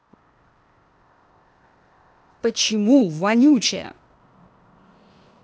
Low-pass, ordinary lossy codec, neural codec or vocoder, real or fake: none; none; codec, 16 kHz, 0.8 kbps, ZipCodec; fake